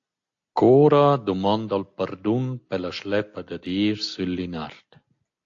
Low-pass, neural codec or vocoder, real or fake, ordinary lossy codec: 7.2 kHz; none; real; AAC, 48 kbps